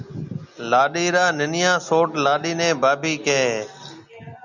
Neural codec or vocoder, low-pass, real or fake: none; 7.2 kHz; real